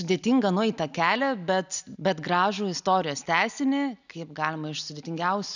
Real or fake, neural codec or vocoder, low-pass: real; none; 7.2 kHz